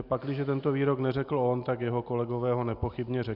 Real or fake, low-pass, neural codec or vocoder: real; 5.4 kHz; none